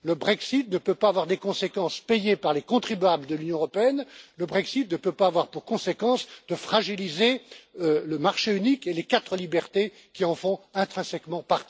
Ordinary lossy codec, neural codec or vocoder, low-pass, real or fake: none; none; none; real